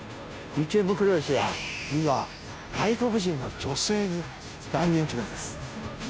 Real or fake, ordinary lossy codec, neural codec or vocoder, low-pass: fake; none; codec, 16 kHz, 0.5 kbps, FunCodec, trained on Chinese and English, 25 frames a second; none